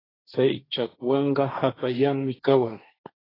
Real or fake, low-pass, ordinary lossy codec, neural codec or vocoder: fake; 5.4 kHz; AAC, 24 kbps; codec, 16 kHz, 1.1 kbps, Voila-Tokenizer